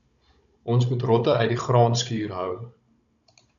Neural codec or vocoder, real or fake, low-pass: codec, 16 kHz, 16 kbps, FunCodec, trained on Chinese and English, 50 frames a second; fake; 7.2 kHz